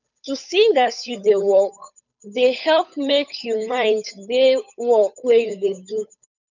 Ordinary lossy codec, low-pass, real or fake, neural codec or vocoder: none; 7.2 kHz; fake; codec, 16 kHz, 8 kbps, FunCodec, trained on Chinese and English, 25 frames a second